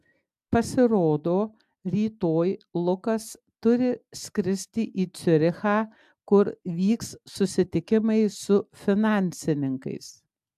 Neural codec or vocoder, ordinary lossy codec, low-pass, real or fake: none; AAC, 96 kbps; 14.4 kHz; real